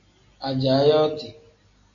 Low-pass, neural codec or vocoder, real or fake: 7.2 kHz; none; real